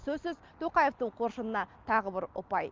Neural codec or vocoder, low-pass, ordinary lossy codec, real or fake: none; 7.2 kHz; Opus, 24 kbps; real